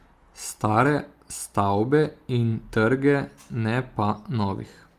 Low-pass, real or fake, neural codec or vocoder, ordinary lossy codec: 14.4 kHz; real; none; Opus, 24 kbps